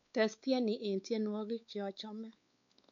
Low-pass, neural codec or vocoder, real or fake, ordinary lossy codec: 7.2 kHz; codec, 16 kHz, 4 kbps, X-Codec, WavLM features, trained on Multilingual LibriSpeech; fake; none